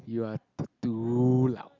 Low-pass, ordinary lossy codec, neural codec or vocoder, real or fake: 7.2 kHz; none; none; real